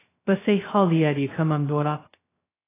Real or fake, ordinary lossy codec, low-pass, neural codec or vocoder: fake; AAC, 16 kbps; 3.6 kHz; codec, 16 kHz, 0.2 kbps, FocalCodec